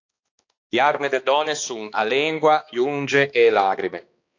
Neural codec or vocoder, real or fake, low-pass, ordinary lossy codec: codec, 16 kHz, 2 kbps, X-Codec, HuBERT features, trained on balanced general audio; fake; 7.2 kHz; AAC, 48 kbps